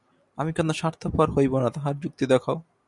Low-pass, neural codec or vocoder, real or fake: 10.8 kHz; none; real